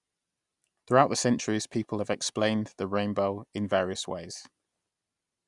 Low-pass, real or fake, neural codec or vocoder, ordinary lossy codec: 10.8 kHz; real; none; Opus, 64 kbps